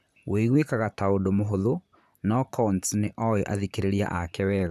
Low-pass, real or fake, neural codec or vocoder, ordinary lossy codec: 14.4 kHz; fake; vocoder, 44.1 kHz, 128 mel bands, Pupu-Vocoder; none